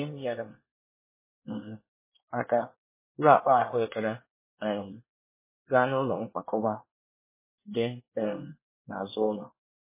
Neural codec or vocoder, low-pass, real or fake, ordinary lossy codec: codec, 24 kHz, 1 kbps, SNAC; 3.6 kHz; fake; MP3, 16 kbps